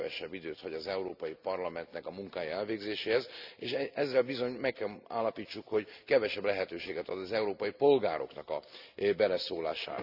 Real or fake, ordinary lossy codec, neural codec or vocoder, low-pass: real; none; none; 5.4 kHz